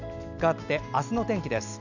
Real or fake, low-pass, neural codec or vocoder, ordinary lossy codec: real; 7.2 kHz; none; none